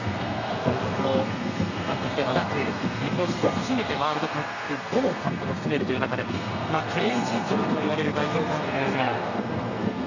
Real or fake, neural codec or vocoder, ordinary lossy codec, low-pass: fake; codec, 32 kHz, 1.9 kbps, SNAC; none; 7.2 kHz